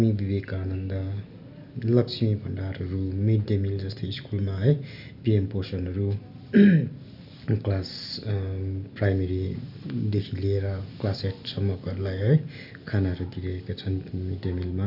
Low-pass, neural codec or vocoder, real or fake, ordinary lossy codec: 5.4 kHz; none; real; none